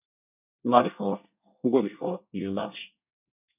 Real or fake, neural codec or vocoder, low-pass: fake; codec, 24 kHz, 1 kbps, SNAC; 3.6 kHz